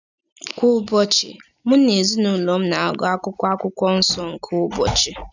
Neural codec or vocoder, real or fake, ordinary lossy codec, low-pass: none; real; none; 7.2 kHz